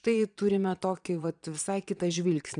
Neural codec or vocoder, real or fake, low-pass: vocoder, 22.05 kHz, 80 mel bands, Vocos; fake; 9.9 kHz